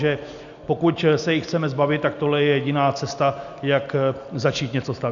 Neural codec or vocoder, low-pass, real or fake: none; 7.2 kHz; real